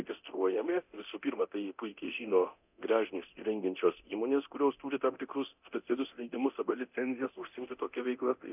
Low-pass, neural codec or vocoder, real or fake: 3.6 kHz; codec, 24 kHz, 0.9 kbps, DualCodec; fake